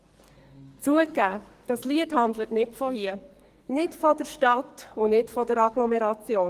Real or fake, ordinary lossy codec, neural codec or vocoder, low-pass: fake; Opus, 24 kbps; codec, 44.1 kHz, 2.6 kbps, SNAC; 14.4 kHz